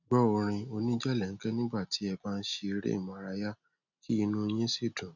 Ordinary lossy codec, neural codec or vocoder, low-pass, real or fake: none; none; 7.2 kHz; real